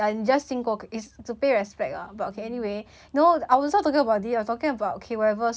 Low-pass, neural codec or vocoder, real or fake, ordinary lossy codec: none; none; real; none